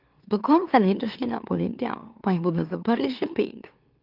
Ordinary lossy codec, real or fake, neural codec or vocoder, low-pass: Opus, 32 kbps; fake; autoencoder, 44.1 kHz, a latent of 192 numbers a frame, MeloTTS; 5.4 kHz